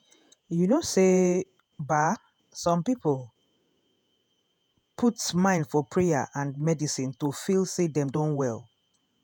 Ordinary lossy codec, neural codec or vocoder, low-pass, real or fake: none; vocoder, 48 kHz, 128 mel bands, Vocos; none; fake